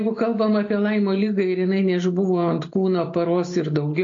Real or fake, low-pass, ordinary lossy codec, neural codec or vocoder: real; 7.2 kHz; AAC, 48 kbps; none